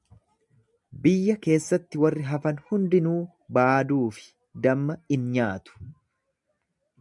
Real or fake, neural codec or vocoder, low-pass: real; none; 10.8 kHz